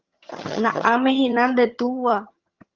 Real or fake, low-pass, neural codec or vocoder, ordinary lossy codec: fake; 7.2 kHz; vocoder, 22.05 kHz, 80 mel bands, HiFi-GAN; Opus, 32 kbps